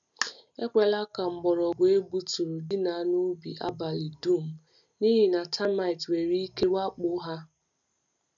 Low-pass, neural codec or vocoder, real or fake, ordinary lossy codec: 7.2 kHz; none; real; none